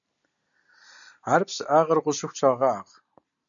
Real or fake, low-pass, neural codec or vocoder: real; 7.2 kHz; none